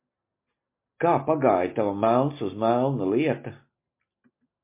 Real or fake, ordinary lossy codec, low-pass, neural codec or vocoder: real; MP3, 24 kbps; 3.6 kHz; none